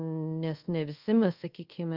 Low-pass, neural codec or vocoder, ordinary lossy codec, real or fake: 5.4 kHz; codec, 24 kHz, 0.5 kbps, DualCodec; Opus, 32 kbps; fake